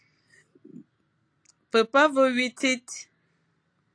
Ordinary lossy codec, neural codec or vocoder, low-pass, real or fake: AAC, 48 kbps; none; 9.9 kHz; real